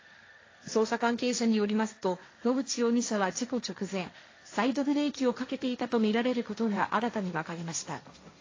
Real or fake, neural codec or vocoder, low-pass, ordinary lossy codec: fake; codec, 16 kHz, 1.1 kbps, Voila-Tokenizer; 7.2 kHz; AAC, 32 kbps